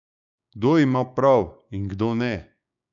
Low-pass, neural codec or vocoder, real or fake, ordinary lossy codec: 7.2 kHz; codec, 16 kHz, 6 kbps, DAC; fake; none